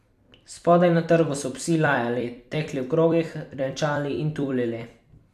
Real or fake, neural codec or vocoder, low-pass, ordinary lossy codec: fake; vocoder, 44.1 kHz, 128 mel bands every 256 samples, BigVGAN v2; 14.4 kHz; MP3, 96 kbps